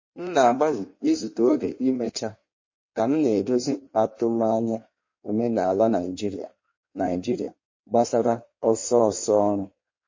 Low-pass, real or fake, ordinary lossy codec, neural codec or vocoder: 7.2 kHz; fake; MP3, 32 kbps; codec, 16 kHz in and 24 kHz out, 1.1 kbps, FireRedTTS-2 codec